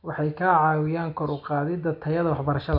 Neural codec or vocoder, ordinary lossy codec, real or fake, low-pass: none; none; real; 5.4 kHz